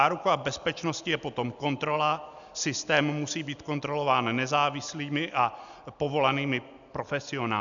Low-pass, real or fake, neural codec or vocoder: 7.2 kHz; real; none